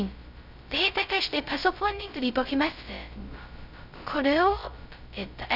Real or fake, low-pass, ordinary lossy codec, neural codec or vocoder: fake; 5.4 kHz; none; codec, 16 kHz, 0.2 kbps, FocalCodec